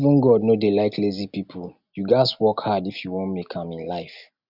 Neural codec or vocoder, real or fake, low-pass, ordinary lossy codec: none; real; 5.4 kHz; none